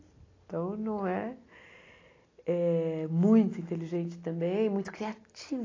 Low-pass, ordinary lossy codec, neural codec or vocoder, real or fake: 7.2 kHz; AAC, 32 kbps; none; real